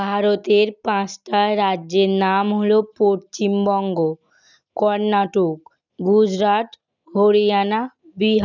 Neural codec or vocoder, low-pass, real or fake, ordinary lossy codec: none; 7.2 kHz; real; none